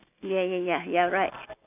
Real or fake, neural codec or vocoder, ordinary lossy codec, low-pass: real; none; none; 3.6 kHz